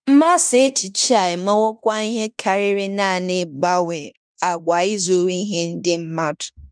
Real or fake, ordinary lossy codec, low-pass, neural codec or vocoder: fake; none; 9.9 kHz; codec, 16 kHz in and 24 kHz out, 0.9 kbps, LongCat-Audio-Codec, fine tuned four codebook decoder